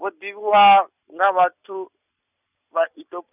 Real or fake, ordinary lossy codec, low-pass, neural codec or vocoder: real; none; 3.6 kHz; none